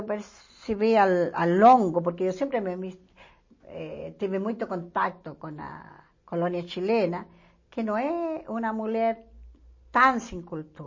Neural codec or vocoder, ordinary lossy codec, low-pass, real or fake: none; MP3, 32 kbps; 7.2 kHz; real